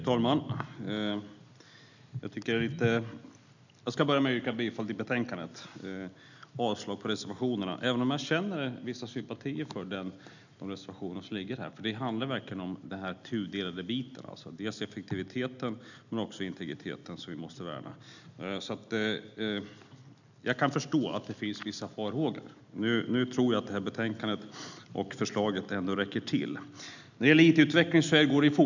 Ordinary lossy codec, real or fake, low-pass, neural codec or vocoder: none; real; 7.2 kHz; none